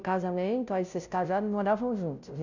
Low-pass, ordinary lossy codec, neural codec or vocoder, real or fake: 7.2 kHz; none; codec, 16 kHz, 0.5 kbps, FunCodec, trained on Chinese and English, 25 frames a second; fake